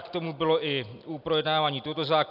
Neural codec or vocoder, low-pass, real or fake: none; 5.4 kHz; real